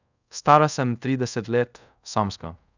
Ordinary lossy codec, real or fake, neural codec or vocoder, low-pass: none; fake; codec, 24 kHz, 0.5 kbps, DualCodec; 7.2 kHz